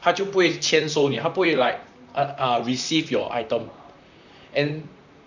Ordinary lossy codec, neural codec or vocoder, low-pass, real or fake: none; vocoder, 44.1 kHz, 128 mel bands, Pupu-Vocoder; 7.2 kHz; fake